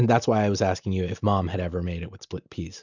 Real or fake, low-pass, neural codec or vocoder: real; 7.2 kHz; none